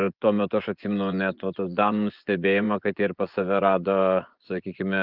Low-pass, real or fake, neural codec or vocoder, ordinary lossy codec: 5.4 kHz; fake; vocoder, 24 kHz, 100 mel bands, Vocos; Opus, 24 kbps